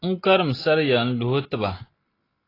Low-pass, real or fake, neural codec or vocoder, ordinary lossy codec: 5.4 kHz; real; none; AAC, 24 kbps